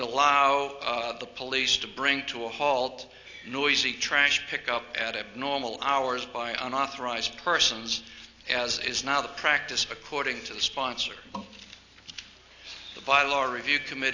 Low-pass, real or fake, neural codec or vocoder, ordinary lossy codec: 7.2 kHz; real; none; AAC, 48 kbps